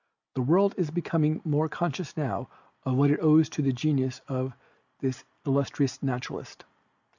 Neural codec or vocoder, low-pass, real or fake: none; 7.2 kHz; real